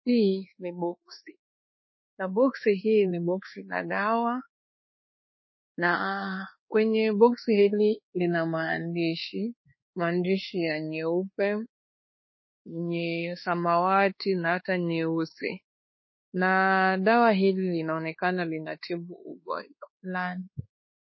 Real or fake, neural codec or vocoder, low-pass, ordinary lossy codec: fake; codec, 24 kHz, 1.2 kbps, DualCodec; 7.2 kHz; MP3, 24 kbps